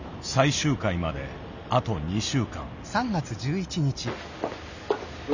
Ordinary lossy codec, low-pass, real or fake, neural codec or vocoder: none; 7.2 kHz; real; none